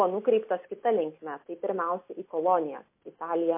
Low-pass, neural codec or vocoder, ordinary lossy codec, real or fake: 3.6 kHz; none; MP3, 24 kbps; real